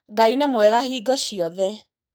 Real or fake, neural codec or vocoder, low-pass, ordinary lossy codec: fake; codec, 44.1 kHz, 2.6 kbps, SNAC; none; none